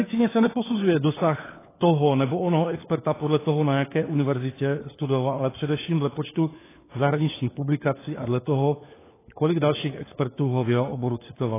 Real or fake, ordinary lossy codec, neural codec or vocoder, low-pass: fake; AAC, 16 kbps; codec, 16 kHz, 4 kbps, FunCodec, trained on LibriTTS, 50 frames a second; 3.6 kHz